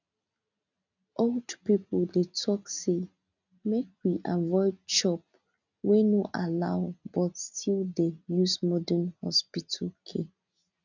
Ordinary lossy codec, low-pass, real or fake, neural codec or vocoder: none; 7.2 kHz; real; none